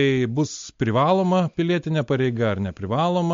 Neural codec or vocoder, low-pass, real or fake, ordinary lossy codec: none; 7.2 kHz; real; MP3, 48 kbps